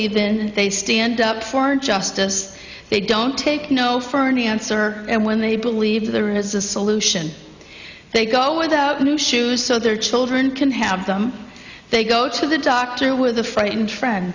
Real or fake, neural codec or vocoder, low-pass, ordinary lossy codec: real; none; 7.2 kHz; Opus, 64 kbps